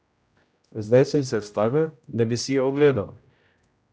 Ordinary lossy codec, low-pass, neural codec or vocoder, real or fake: none; none; codec, 16 kHz, 0.5 kbps, X-Codec, HuBERT features, trained on general audio; fake